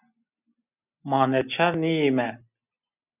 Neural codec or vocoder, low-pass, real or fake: none; 3.6 kHz; real